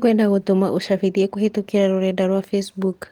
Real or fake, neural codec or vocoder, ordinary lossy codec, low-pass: real; none; Opus, 24 kbps; 19.8 kHz